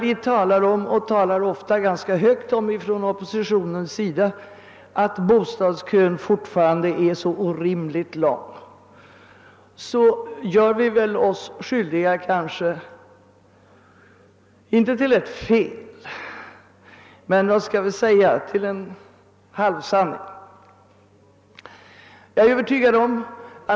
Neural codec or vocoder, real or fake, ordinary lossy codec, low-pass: none; real; none; none